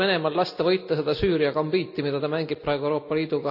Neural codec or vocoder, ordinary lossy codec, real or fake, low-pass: none; none; real; 5.4 kHz